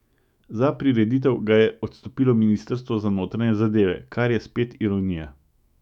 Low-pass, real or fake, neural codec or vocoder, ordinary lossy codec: 19.8 kHz; fake; autoencoder, 48 kHz, 128 numbers a frame, DAC-VAE, trained on Japanese speech; none